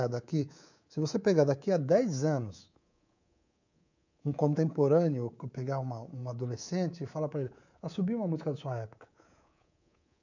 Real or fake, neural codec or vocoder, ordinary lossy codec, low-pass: fake; codec, 24 kHz, 3.1 kbps, DualCodec; none; 7.2 kHz